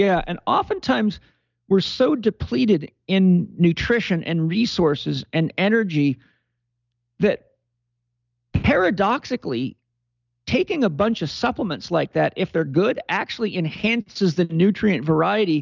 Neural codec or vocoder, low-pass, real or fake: none; 7.2 kHz; real